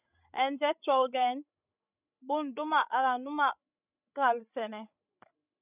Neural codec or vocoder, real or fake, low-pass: codec, 16 kHz, 16 kbps, FreqCodec, larger model; fake; 3.6 kHz